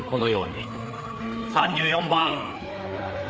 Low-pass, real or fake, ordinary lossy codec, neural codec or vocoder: none; fake; none; codec, 16 kHz, 4 kbps, FreqCodec, larger model